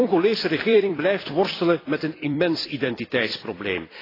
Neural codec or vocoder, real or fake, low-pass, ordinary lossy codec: vocoder, 44.1 kHz, 128 mel bands every 256 samples, BigVGAN v2; fake; 5.4 kHz; AAC, 24 kbps